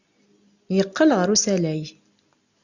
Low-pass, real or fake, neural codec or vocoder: 7.2 kHz; real; none